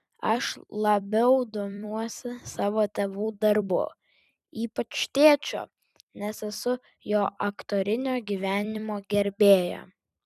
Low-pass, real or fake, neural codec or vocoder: 14.4 kHz; fake; vocoder, 44.1 kHz, 128 mel bands every 256 samples, BigVGAN v2